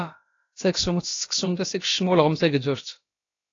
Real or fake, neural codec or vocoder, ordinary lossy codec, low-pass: fake; codec, 16 kHz, about 1 kbps, DyCAST, with the encoder's durations; AAC, 32 kbps; 7.2 kHz